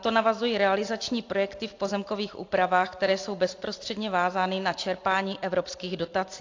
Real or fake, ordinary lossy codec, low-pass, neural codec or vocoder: real; AAC, 48 kbps; 7.2 kHz; none